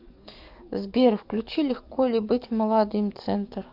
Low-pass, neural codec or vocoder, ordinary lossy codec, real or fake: 5.4 kHz; codec, 44.1 kHz, 7.8 kbps, DAC; MP3, 48 kbps; fake